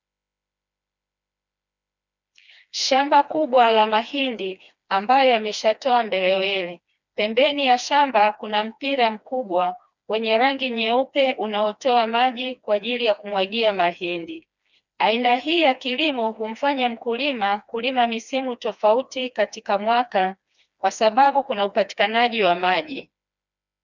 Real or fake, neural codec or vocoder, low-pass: fake; codec, 16 kHz, 2 kbps, FreqCodec, smaller model; 7.2 kHz